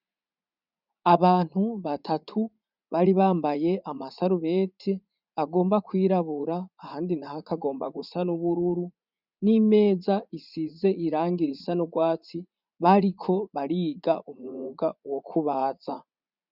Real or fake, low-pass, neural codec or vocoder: fake; 5.4 kHz; vocoder, 44.1 kHz, 80 mel bands, Vocos